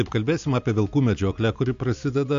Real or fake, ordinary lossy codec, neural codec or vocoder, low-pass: real; AAC, 64 kbps; none; 7.2 kHz